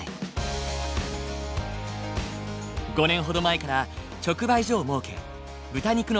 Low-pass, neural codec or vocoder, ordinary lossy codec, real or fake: none; none; none; real